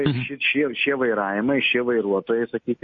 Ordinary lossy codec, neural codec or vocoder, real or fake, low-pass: MP3, 32 kbps; none; real; 7.2 kHz